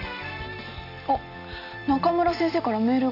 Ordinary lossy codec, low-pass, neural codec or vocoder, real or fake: none; 5.4 kHz; none; real